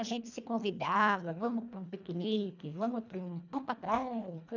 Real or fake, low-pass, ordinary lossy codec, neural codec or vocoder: fake; 7.2 kHz; none; codec, 24 kHz, 1.5 kbps, HILCodec